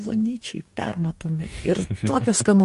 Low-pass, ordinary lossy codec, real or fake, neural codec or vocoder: 14.4 kHz; MP3, 48 kbps; fake; codec, 44.1 kHz, 2.6 kbps, DAC